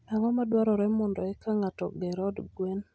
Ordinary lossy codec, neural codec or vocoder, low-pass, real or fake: none; none; none; real